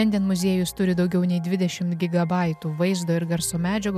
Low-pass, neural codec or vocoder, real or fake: 14.4 kHz; none; real